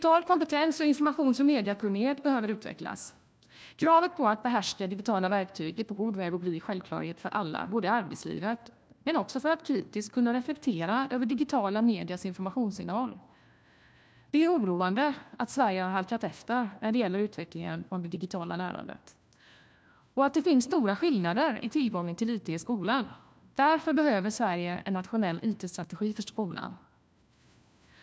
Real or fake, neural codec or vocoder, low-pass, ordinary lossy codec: fake; codec, 16 kHz, 1 kbps, FunCodec, trained on LibriTTS, 50 frames a second; none; none